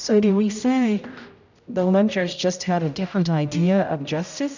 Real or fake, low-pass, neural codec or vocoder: fake; 7.2 kHz; codec, 16 kHz, 0.5 kbps, X-Codec, HuBERT features, trained on general audio